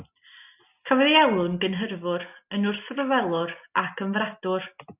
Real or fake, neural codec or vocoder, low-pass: real; none; 3.6 kHz